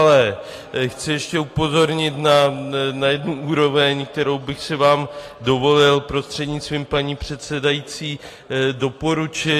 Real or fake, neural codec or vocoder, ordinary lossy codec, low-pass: real; none; AAC, 48 kbps; 14.4 kHz